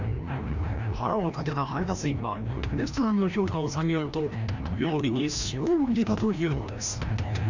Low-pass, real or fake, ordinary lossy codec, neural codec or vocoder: 7.2 kHz; fake; none; codec, 16 kHz, 1 kbps, FreqCodec, larger model